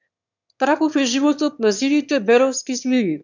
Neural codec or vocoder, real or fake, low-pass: autoencoder, 22.05 kHz, a latent of 192 numbers a frame, VITS, trained on one speaker; fake; 7.2 kHz